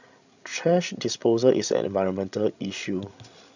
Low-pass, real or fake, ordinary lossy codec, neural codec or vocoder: 7.2 kHz; fake; none; codec, 16 kHz, 16 kbps, FreqCodec, larger model